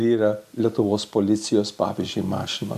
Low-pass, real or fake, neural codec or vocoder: 14.4 kHz; real; none